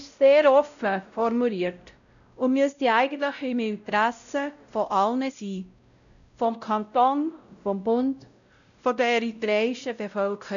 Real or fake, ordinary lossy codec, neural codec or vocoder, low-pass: fake; none; codec, 16 kHz, 0.5 kbps, X-Codec, WavLM features, trained on Multilingual LibriSpeech; 7.2 kHz